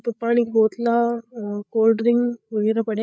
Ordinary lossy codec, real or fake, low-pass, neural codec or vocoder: none; fake; none; codec, 16 kHz, 8 kbps, FreqCodec, larger model